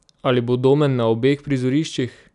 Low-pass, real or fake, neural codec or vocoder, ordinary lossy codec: 10.8 kHz; real; none; none